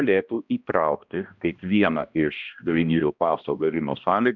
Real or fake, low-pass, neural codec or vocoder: fake; 7.2 kHz; codec, 16 kHz, 1 kbps, X-Codec, HuBERT features, trained on balanced general audio